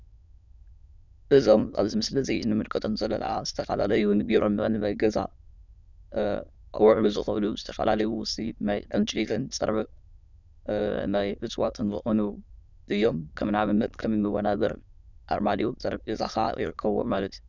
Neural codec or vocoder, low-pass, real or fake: autoencoder, 22.05 kHz, a latent of 192 numbers a frame, VITS, trained on many speakers; 7.2 kHz; fake